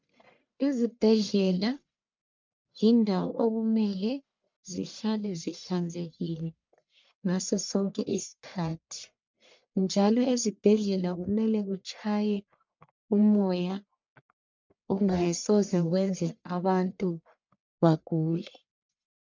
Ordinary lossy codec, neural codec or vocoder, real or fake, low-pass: MP3, 64 kbps; codec, 44.1 kHz, 1.7 kbps, Pupu-Codec; fake; 7.2 kHz